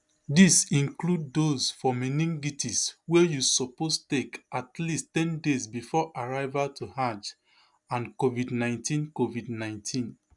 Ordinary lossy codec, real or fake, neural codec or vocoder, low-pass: none; real; none; 10.8 kHz